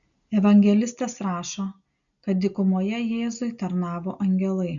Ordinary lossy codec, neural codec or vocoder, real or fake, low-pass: MP3, 96 kbps; none; real; 7.2 kHz